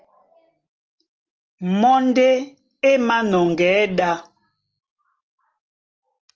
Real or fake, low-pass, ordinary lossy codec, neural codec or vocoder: real; 7.2 kHz; Opus, 24 kbps; none